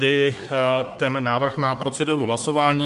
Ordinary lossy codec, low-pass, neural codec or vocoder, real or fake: MP3, 64 kbps; 10.8 kHz; codec, 24 kHz, 1 kbps, SNAC; fake